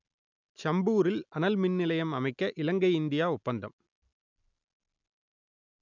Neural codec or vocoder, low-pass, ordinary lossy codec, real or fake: none; 7.2 kHz; none; real